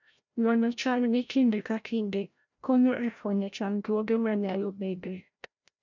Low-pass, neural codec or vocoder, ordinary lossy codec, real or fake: 7.2 kHz; codec, 16 kHz, 0.5 kbps, FreqCodec, larger model; none; fake